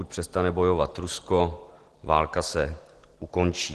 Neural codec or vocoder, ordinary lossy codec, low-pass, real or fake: none; Opus, 16 kbps; 10.8 kHz; real